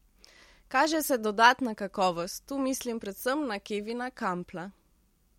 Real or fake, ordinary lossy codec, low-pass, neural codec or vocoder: real; MP3, 64 kbps; 19.8 kHz; none